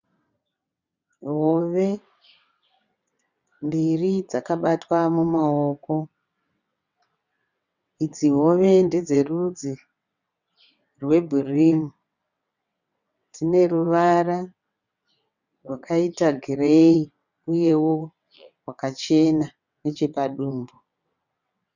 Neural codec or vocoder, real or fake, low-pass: vocoder, 22.05 kHz, 80 mel bands, WaveNeXt; fake; 7.2 kHz